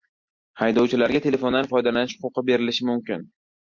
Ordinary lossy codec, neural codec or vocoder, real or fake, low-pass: MP3, 48 kbps; none; real; 7.2 kHz